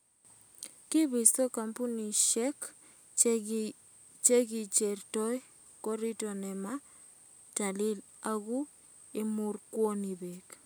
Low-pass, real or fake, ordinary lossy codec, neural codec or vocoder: none; real; none; none